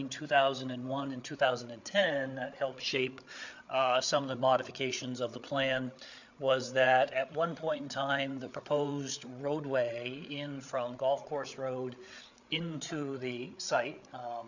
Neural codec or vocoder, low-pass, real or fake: codec, 16 kHz, 8 kbps, FreqCodec, larger model; 7.2 kHz; fake